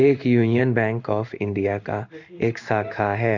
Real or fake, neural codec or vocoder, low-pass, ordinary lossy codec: fake; codec, 16 kHz in and 24 kHz out, 1 kbps, XY-Tokenizer; 7.2 kHz; Opus, 64 kbps